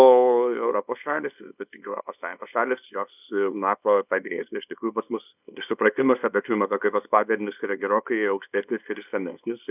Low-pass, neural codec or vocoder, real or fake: 3.6 kHz; codec, 24 kHz, 0.9 kbps, WavTokenizer, small release; fake